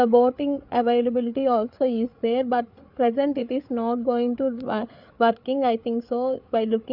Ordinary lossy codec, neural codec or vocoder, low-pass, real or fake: none; codec, 16 kHz, 8 kbps, FreqCodec, larger model; 5.4 kHz; fake